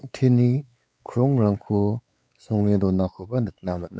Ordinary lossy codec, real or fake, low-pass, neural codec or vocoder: none; fake; none; codec, 16 kHz, 4 kbps, X-Codec, WavLM features, trained on Multilingual LibriSpeech